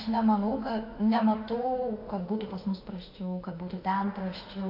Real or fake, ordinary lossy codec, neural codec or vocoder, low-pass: fake; AAC, 32 kbps; autoencoder, 48 kHz, 32 numbers a frame, DAC-VAE, trained on Japanese speech; 5.4 kHz